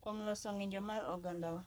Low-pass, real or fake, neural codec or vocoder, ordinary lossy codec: none; fake; codec, 44.1 kHz, 3.4 kbps, Pupu-Codec; none